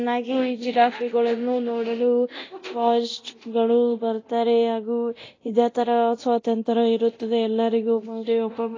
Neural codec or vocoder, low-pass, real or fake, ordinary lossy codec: codec, 24 kHz, 0.9 kbps, DualCodec; 7.2 kHz; fake; AAC, 32 kbps